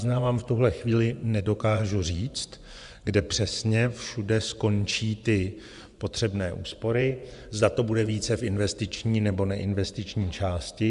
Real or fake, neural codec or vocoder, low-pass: fake; vocoder, 24 kHz, 100 mel bands, Vocos; 10.8 kHz